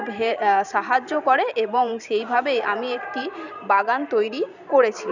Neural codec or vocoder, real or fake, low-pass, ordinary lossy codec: vocoder, 44.1 kHz, 128 mel bands every 512 samples, BigVGAN v2; fake; 7.2 kHz; none